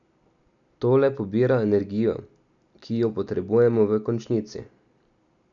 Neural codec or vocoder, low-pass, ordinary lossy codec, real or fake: none; 7.2 kHz; none; real